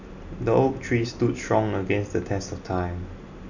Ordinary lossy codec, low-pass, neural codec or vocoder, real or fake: none; 7.2 kHz; none; real